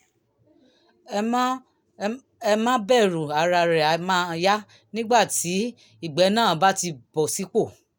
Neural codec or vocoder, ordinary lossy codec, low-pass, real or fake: none; none; none; real